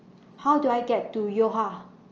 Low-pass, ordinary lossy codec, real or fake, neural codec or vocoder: 7.2 kHz; Opus, 24 kbps; real; none